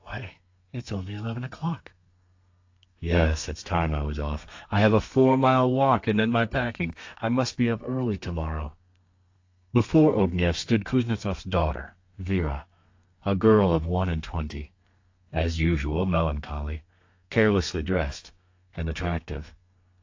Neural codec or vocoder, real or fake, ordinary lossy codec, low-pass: codec, 32 kHz, 1.9 kbps, SNAC; fake; AAC, 48 kbps; 7.2 kHz